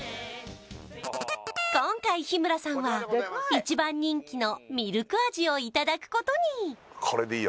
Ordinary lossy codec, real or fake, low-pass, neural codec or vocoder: none; real; none; none